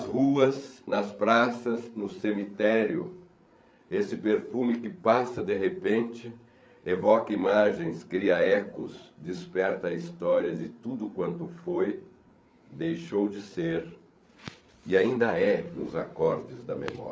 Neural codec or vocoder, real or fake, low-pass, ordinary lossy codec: codec, 16 kHz, 8 kbps, FreqCodec, larger model; fake; none; none